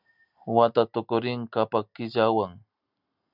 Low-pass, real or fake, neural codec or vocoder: 5.4 kHz; real; none